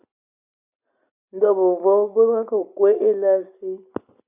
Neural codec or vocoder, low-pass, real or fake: none; 3.6 kHz; real